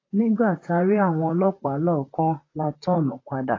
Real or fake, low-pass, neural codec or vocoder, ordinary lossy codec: fake; 7.2 kHz; vocoder, 44.1 kHz, 128 mel bands, Pupu-Vocoder; AAC, 32 kbps